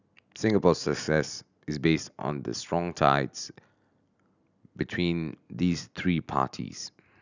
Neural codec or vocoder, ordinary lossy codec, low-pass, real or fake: none; none; 7.2 kHz; real